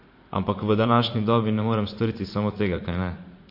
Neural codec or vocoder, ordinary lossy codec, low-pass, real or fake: vocoder, 44.1 kHz, 80 mel bands, Vocos; MP3, 32 kbps; 5.4 kHz; fake